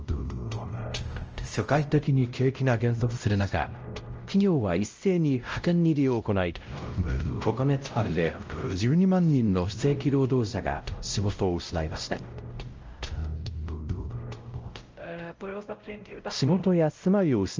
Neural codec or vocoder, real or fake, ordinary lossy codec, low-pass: codec, 16 kHz, 0.5 kbps, X-Codec, WavLM features, trained on Multilingual LibriSpeech; fake; Opus, 24 kbps; 7.2 kHz